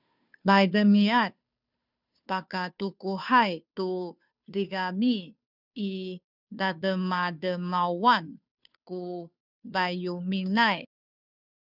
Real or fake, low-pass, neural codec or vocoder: fake; 5.4 kHz; codec, 16 kHz, 2 kbps, FunCodec, trained on Chinese and English, 25 frames a second